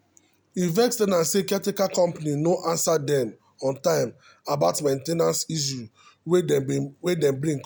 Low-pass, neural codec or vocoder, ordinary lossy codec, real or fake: none; none; none; real